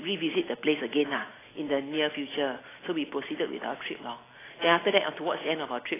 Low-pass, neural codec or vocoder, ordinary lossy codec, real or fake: 3.6 kHz; none; AAC, 16 kbps; real